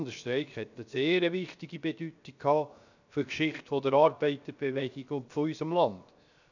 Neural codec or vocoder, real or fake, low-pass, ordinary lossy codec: codec, 16 kHz, 0.7 kbps, FocalCodec; fake; 7.2 kHz; none